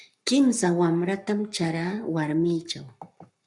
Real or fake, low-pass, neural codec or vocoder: fake; 10.8 kHz; codec, 44.1 kHz, 7.8 kbps, Pupu-Codec